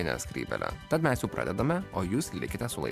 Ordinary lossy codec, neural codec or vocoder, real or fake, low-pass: MP3, 96 kbps; vocoder, 44.1 kHz, 128 mel bands every 512 samples, BigVGAN v2; fake; 14.4 kHz